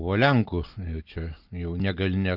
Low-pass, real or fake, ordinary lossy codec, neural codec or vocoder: 5.4 kHz; real; Opus, 24 kbps; none